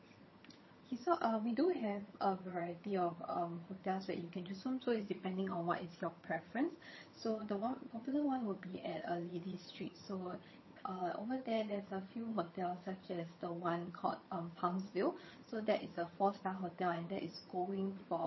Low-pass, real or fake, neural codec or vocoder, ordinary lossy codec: 7.2 kHz; fake; vocoder, 22.05 kHz, 80 mel bands, HiFi-GAN; MP3, 24 kbps